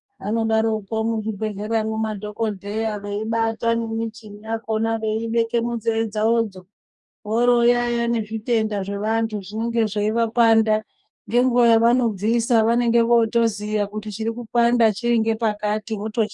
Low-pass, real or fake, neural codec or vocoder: 10.8 kHz; fake; codec, 44.1 kHz, 2.6 kbps, DAC